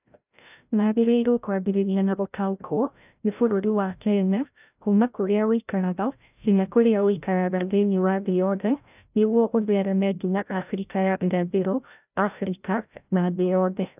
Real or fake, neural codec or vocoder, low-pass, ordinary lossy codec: fake; codec, 16 kHz, 0.5 kbps, FreqCodec, larger model; 3.6 kHz; none